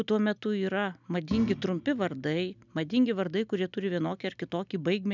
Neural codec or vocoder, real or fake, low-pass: none; real; 7.2 kHz